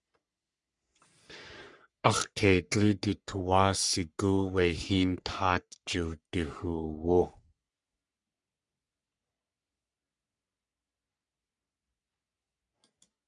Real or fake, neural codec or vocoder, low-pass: fake; codec, 44.1 kHz, 3.4 kbps, Pupu-Codec; 10.8 kHz